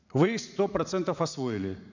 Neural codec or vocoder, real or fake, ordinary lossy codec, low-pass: vocoder, 44.1 kHz, 80 mel bands, Vocos; fake; none; 7.2 kHz